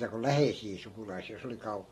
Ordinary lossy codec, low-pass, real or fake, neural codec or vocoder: AAC, 32 kbps; 19.8 kHz; real; none